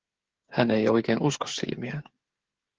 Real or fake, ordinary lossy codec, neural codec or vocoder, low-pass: fake; Opus, 16 kbps; codec, 16 kHz, 16 kbps, FreqCodec, smaller model; 7.2 kHz